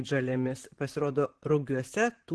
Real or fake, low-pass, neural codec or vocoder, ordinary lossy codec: fake; 10.8 kHz; vocoder, 44.1 kHz, 128 mel bands, Pupu-Vocoder; Opus, 16 kbps